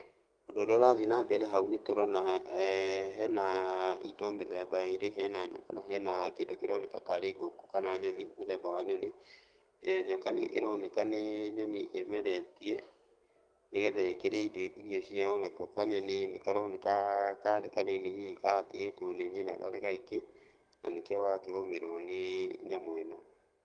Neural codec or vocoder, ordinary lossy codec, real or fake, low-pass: codec, 32 kHz, 1.9 kbps, SNAC; Opus, 32 kbps; fake; 9.9 kHz